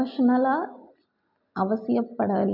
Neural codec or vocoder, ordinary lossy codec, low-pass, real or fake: none; none; 5.4 kHz; real